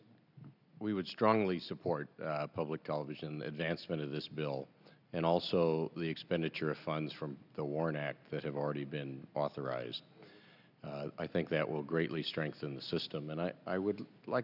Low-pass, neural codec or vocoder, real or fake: 5.4 kHz; none; real